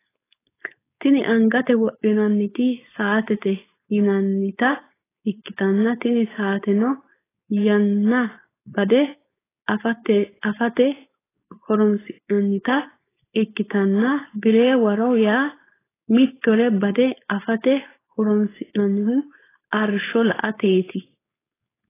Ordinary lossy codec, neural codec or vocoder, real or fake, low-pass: AAC, 16 kbps; codec, 16 kHz, 4.8 kbps, FACodec; fake; 3.6 kHz